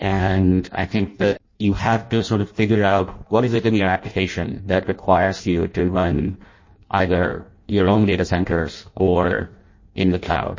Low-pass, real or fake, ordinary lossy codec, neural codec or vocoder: 7.2 kHz; fake; MP3, 32 kbps; codec, 16 kHz in and 24 kHz out, 0.6 kbps, FireRedTTS-2 codec